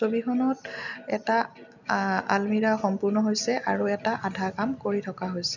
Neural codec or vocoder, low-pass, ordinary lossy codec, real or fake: none; 7.2 kHz; none; real